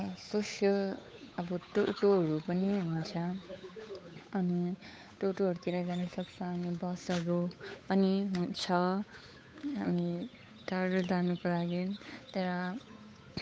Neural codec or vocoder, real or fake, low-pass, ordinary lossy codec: codec, 16 kHz, 8 kbps, FunCodec, trained on Chinese and English, 25 frames a second; fake; none; none